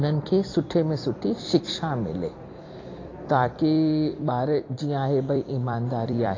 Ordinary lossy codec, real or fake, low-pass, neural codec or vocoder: AAC, 32 kbps; fake; 7.2 kHz; vocoder, 44.1 kHz, 128 mel bands every 256 samples, BigVGAN v2